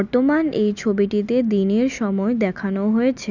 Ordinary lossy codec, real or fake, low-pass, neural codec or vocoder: none; real; 7.2 kHz; none